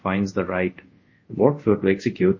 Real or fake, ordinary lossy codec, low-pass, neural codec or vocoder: fake; MP3, 32 kbps; 7.2 kHz; codec, 24 kHz, 0.5 kbps, DualCodec